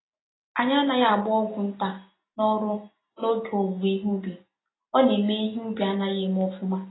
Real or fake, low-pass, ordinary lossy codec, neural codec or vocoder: real; 7.2 kHz; AAC, 16 kbps; none